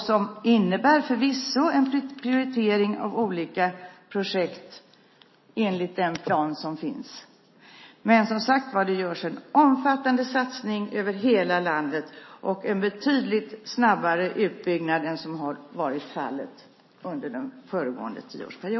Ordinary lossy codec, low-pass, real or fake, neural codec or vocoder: MP3, 24 kbps; 7.2 kHz; real; none